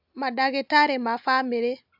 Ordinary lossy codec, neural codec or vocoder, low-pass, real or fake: AAC, 48 kbps; none; 5.4 kHz; real